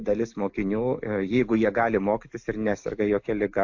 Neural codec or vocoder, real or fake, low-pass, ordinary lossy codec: none; real; 7.2 kHz; AAC, 48 kbps